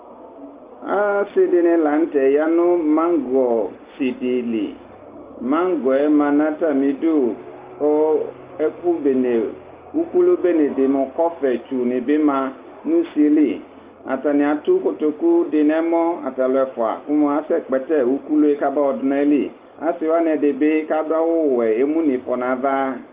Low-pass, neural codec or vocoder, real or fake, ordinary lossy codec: 3.6 kHz; none; real; Opus, 24 kbps